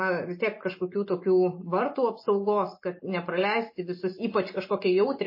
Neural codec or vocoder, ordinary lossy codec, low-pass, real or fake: none; MP3, 24 kbps; 5.4 kHz; real